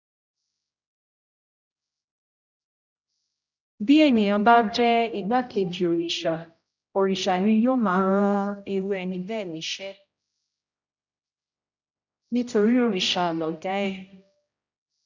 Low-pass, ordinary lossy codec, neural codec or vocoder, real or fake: 7.2 kHz; none; codec, 16 kHz, 0.5 kbps, X-Codec, HuBERT features, trained on general audio; fake